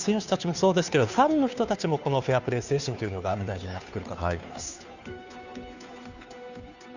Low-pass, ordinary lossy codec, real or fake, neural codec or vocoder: 7.2 kHz; none; fake; codec, 16 kHz, 2 kbps, FunCodec, trained on Chinese and English, 25 frames a second